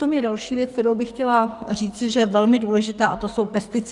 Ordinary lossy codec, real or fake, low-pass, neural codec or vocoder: Opus, 64 kbps; fake; 10.8 kHz; codec, 44.1 kHz, 2.6 kbps, SNAC